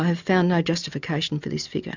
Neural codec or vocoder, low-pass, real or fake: none; 7.2 kHz; real